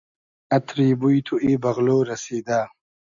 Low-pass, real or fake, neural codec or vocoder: 7.2 kHz; real; none